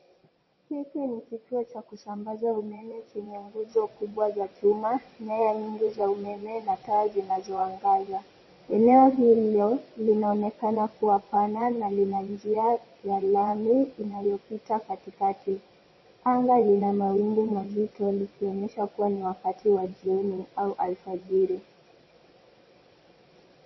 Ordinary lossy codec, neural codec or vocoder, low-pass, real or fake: MP3, 24 kbps; vocoder, 22.05 kHz, 80 mel bands, WaveNeXt; 7.2 kHz; fake